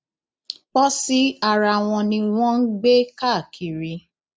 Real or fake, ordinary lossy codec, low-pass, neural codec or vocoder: real; none; none; none